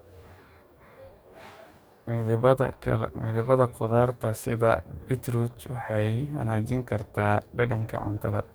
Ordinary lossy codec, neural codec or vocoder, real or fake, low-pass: none; codec, 44.1 kHz, 2.6 kbps, DAC; fake; none